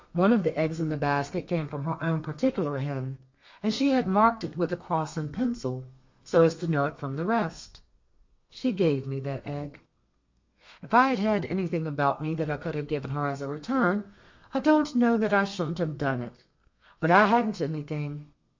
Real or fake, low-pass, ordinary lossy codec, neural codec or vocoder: fake; 7.2 kHz; MP3, 48 kbps; codec, 32 kHz, 1.9 kbps, SNAC